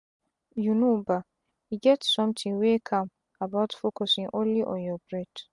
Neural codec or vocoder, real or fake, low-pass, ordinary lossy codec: none; real; 10.8 kHz; MP3, 64 kbps